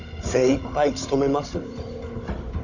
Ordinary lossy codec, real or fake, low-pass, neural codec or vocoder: none; fake; 7.2 kHz; codec, 16 kHz, 16 kbps, FunCodec, trained on Chinese and English, 50 frames a second